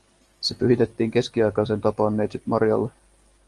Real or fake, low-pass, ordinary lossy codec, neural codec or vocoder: real; 10.8 kHz; Opus, 24 kbps; none